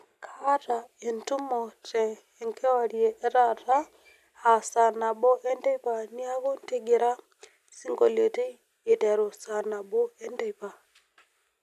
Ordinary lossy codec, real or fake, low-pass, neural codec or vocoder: none; real; 14.4 kHz; none